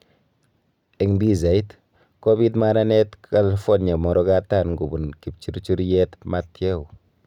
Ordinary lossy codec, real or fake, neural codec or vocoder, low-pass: none; real; none; 19.8 kHz